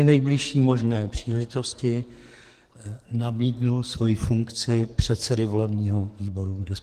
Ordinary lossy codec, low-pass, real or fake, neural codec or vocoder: Opus, 24 kbps; 14.4 kHz; fake; codec, 44.1 kHz, 2.6 kbps, SNAC